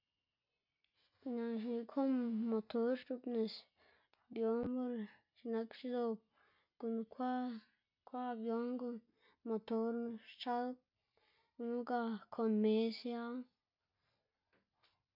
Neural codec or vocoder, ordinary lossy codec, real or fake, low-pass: none; MP3, 48 kbps; real; 5.4 kHz